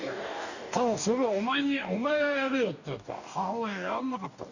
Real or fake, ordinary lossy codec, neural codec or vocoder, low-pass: fake; none; codec, 44.1 kHz, 2.6 kbps, DAC; 7.2 kHz